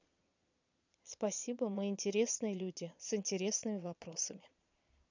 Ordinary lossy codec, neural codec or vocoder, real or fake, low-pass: none; vocoder, 22.05 kHz, 80 mel bands, Vocos; fake; 7.2 kHz